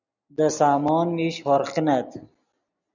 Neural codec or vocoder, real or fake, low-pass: none; real; 7.2 kHz